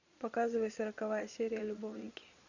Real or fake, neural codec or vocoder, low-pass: fake; vocoder, 22.05 kHz, 80 mel bands, Vocos; 7.2 kHz